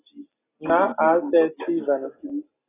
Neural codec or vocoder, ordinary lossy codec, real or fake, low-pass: none; AAC, 16 kbps; real; 3.6 kHz